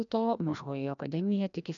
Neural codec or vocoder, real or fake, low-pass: codec, 16 kHz, 1 kbps, FreqCodec, larger model; fake; 7.2 kHz